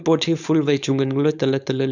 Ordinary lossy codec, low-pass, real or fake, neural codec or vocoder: none; 7.2 kHz; fake; codec, 16 kHz, 4.8 kbps, FACodec